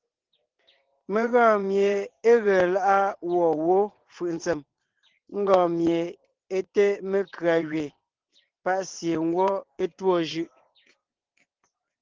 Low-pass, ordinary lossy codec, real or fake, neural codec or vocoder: 7.2 kHz; Opus, 16 kbps; fake; vocoder, 22.05 kHz, 80 mel bands, WaveNeXt